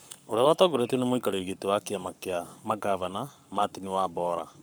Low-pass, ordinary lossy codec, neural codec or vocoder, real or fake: none; none; codec, 44.1 kHz, 7.8 kbps, Pupu-Codec; fake